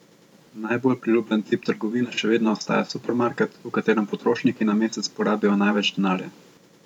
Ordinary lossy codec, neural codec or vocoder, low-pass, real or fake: MP3, 96 kbps; vocoder, 44.1 kHz, 128 mel bands every 512 samples, BigVGAN v2; 19.8 kHz; fake